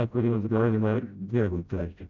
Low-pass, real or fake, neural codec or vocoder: 7.2 kHz; fake; codec, 16 kHz, 0.5 kbps, FreqCodec, smaller model